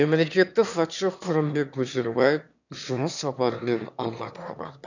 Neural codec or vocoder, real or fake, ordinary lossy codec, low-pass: autoencoder, 22.05 kHz, a latent of 192 numbers a frame, VITS, trained on one speaker; fake; none; 7.2 kHz